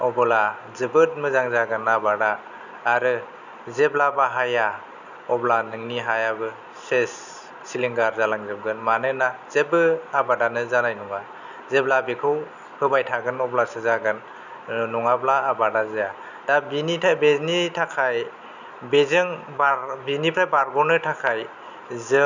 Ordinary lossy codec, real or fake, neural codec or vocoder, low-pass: none; real; none; 7.2 kHz